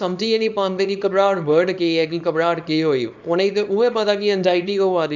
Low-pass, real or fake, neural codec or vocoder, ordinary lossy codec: 7.2 kHz; fake; codec, 24 kHz, 0.9 kbps, WavTokenizer, small release; none